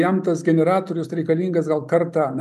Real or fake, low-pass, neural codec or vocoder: real; 14.4 kHz; none